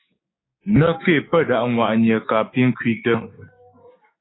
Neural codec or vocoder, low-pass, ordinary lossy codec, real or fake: codec, 16 kHz, 6 kbps, DAC; 7.2 kHz; AAC, 16 kbps; fake